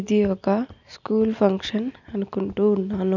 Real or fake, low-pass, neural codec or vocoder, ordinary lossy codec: real; 7.2 kHz; none; none